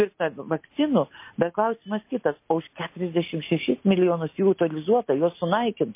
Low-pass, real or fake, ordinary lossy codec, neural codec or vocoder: 3.6 kHz; real; MP3, 24 kbps; none